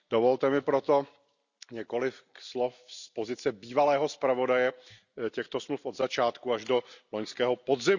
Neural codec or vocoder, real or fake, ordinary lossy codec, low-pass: none; real; none; 7.2 kHz